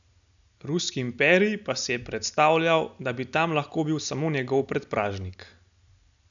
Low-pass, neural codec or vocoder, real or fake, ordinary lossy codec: 7.2 kHz; none; real; none